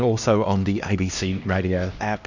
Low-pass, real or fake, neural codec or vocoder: 7.2 kHz; fake; codec, 16 kHz, 1 kbps, X-Codec, HuBERT features, trained on LibriSpeech